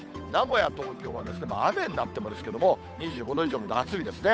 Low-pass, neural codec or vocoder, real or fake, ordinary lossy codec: none; codec, 16 kHz, 8 kbps, FunCodec, trained on Chinese and English, 25 frames a second; fake; none